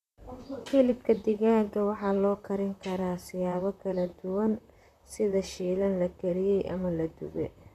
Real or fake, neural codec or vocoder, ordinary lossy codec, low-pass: fake; vocoder, 44.1 kHz, 128 mel bands, Pupu-Vocoder; none; 14.4 kHz